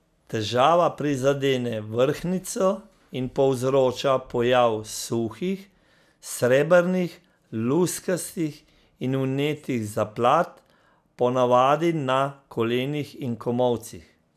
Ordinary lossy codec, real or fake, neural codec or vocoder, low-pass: none; real; none; 14.4 kHz